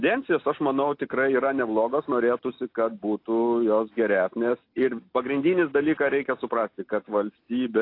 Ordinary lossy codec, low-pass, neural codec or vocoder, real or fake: AAC, 32 kbps; 5.4 kHz; none; real